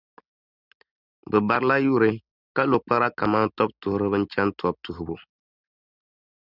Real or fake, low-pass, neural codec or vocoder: real; 5.4 kHz; none